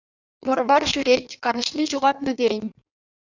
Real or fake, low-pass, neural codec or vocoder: fake; 7.2 kHz; codec, 16 kHz in and 24 kHz out, 1.1 kbps, FireRedTTS-2 codec